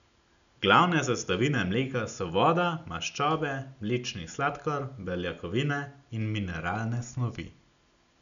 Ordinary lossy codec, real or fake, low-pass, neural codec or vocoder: none; real; 7.2 kHz; none